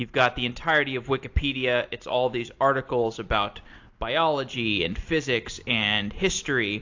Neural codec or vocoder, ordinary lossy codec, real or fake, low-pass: none; AAC, 48 kbps; real; 7.2 kHz